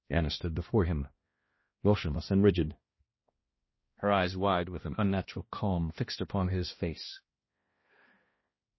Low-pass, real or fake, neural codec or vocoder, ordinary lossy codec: 7.2 kHz; fake; codec, 16 kHz, 1 kbps, X-Codec, HuBERT features, trained on balanced general audio; MP3, 24 kbps